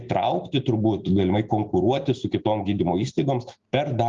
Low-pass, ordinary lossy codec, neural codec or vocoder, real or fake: 7.2 kHz; Opus, 32 kbps; none; real